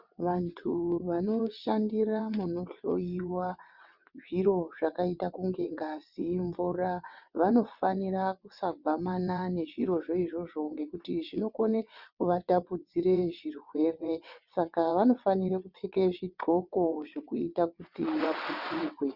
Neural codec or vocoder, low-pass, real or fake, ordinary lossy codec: vocoder, 22.05 kHz, 80 mel bands, WaveNeXt; 5.4 kHz; fake; Opus, 64 kbps